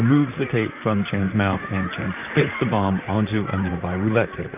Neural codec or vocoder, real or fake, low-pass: vocoder, 44.1 kHz, 128 mel bands, Pupu-Vocoder; fake; 3.6 kHz